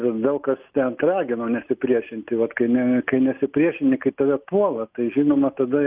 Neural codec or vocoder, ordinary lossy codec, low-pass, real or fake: none; Opus, 32 kbps; 3.6 kHz; real